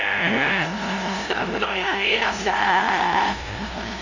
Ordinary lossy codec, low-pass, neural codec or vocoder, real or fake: none; 7.2 kHz; codec, 16 kHz, 0.5 kbps, FunCodec, trained on LibriTTS, 25 frames a second; fake